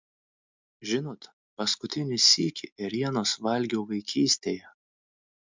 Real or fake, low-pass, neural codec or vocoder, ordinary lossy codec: real; 7.2 kHz; none; MP3, 64 kbps